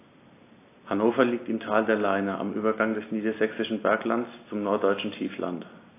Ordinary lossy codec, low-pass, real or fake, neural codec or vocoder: AAC, 24 kbps; 3.6 kHz; real; none